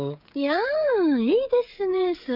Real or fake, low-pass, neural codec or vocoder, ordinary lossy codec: fake; 5.4 kHz; codec, 16 kHz, 8 kbps, FreqCodec, smaller model; none